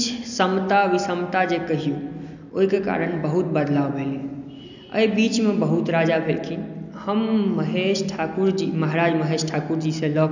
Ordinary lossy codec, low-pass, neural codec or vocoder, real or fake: none; 7.2 kHz; none; real